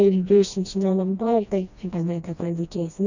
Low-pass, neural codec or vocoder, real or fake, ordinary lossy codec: 7.2 kHz; codec, 16 kHz, 1 kbps, FreqCodec, smaller model; fake; none